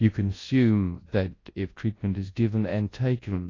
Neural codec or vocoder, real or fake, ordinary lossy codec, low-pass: codec, 24 kHz, 0.9 kbps, WavTokenizer, large speech release; fake; AAC, 32 kbps; 7.2 kHz